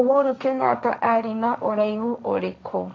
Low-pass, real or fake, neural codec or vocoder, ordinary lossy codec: none; fake; codec, 16 kHz, 1.1 kbps, Voila-Tokenizer; none